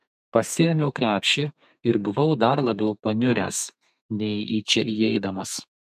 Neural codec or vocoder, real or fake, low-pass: codec, 32 kHz, 1.9 kbps, SNAC; fake; 14.4 kHz